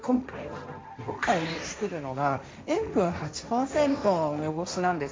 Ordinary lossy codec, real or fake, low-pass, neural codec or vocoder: none; fake; none; codec, 16 kHz, 1.1 kbps, Voila-Tokenizer